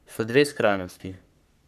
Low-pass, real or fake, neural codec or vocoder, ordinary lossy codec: 14.4 kHz; fake; codec, 44.1 kHz, 3.4 kbps, Pupu-Codec; none